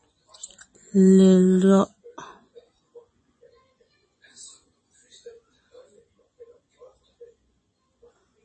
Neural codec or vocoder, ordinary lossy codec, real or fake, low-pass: vocoder, 24 kHz, 100 mel bands, Vocos; MP3, 32 kbps; fake; 10.8 kHz